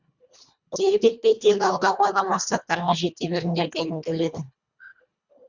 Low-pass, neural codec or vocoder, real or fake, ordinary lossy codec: 7.2 kHz; codec, 24 kHz, 1.5 kbps, HILCodec; fake; Opus, 64 kbps